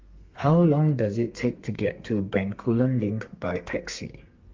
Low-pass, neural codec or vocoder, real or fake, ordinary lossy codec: 7.2 kHz; codec, 32 kHz, 1.9 kbps, SNAC; fake; Opus, 32 kbps